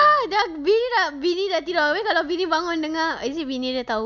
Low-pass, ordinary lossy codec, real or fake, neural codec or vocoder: 7.2 kHz; none; real; none